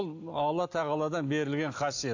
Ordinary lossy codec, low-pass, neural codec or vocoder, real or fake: AAC, 48 kbps; 7.2 kHz; none; real